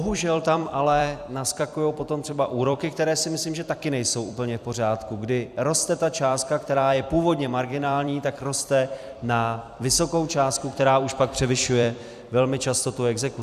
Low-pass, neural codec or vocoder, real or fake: 14.4 kHz; vocoder, 44.1 kHz, 128 mel bands every 256 samples, BigVGAN v2; fake